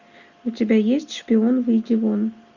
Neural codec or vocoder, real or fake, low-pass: none; real; 7.2 kHz